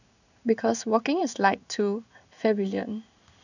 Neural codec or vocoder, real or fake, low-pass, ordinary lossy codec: codec, 16 kHz in and 24 kHz out, 1 kbps, XY-Tokenizer; fake; 7.2 kHz; none